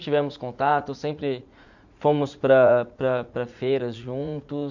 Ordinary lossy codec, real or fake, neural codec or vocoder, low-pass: none; fake; vocoder, 44.1 kHz, 80 mel bands, Vocos; 7.2 kHz